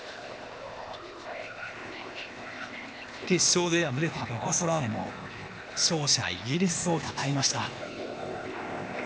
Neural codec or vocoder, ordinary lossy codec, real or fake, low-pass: codec, 16 kHz, 0.8 kbps, ZipCodec; none; fake; none